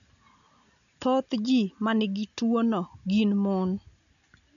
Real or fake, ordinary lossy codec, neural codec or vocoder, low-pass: real; none; none; 7.2 kHz